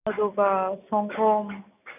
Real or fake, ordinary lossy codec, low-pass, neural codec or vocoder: real; none; 3.6 kHz; none